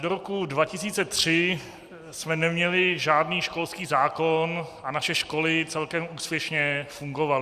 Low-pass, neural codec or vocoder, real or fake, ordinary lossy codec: 14.4 kHz; none; real; Opus, 64 kbps